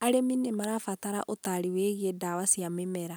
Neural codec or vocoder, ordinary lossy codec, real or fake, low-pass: none; none; real; none